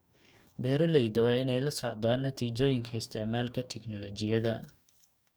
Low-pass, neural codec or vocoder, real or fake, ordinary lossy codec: none; codec, 44.1 kHz, 2.6 kbps, DAC; fake; none